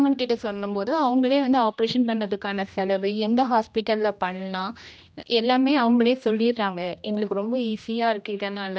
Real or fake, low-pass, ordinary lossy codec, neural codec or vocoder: fake; none; none; codec, 16 kHz, 1 kbps, X-Codec, HuBERT features, trained on general audio